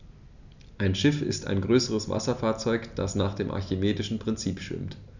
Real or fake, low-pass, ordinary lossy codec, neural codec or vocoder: real; 7.2 kHz; none; none